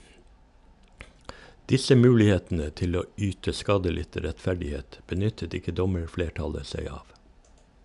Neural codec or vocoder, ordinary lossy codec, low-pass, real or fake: none; none; 10.8 kHz; real